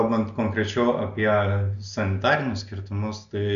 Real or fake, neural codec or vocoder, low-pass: real; none; 7.2 kHz